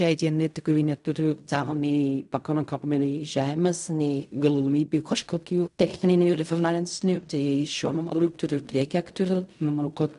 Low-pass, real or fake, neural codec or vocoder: 10.8 kHz; fake; codec, 16 kHz in and 24 kHz out, 0.4 kbps, LongCat-Audio-Codec, fine tuned four codebook decoder